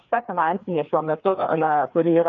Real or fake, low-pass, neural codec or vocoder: fake; 7.2 kHz; codec, 16 kHz, 2 kbps, FreqCodec, larger model